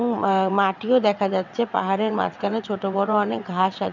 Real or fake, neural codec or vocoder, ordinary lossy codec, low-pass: fake; vocoder, 22.05 kHz, 80 mel bands, WaveNeXt; none; 7.2 kHz